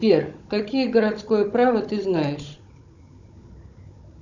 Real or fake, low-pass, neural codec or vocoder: fake; 7.2 kHz; codec, 16 kHz, 16 kbps, FunCodec, trained on Chinese and English, 50 frames a second